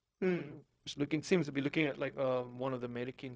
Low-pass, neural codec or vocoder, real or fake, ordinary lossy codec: none; codec, 16 kHz, 0.4 kbps, LongCat-Audio-Codec; fake; none